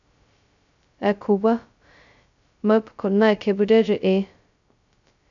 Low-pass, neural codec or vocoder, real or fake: 7.2 kHz; codec, 16 kHz, 0.2 kbps, FocalCodec; fake